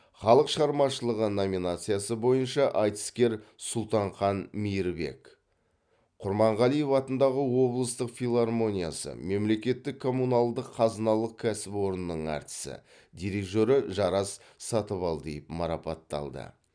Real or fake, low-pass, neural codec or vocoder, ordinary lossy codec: real; 9.9 kHz; none; none